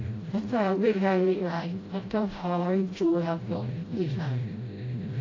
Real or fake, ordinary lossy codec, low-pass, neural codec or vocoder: fake; AAC, 32 kbps; 7.2 kHz; codec, 16 kHz, 0.5 kbps, FreqCodec, smaller model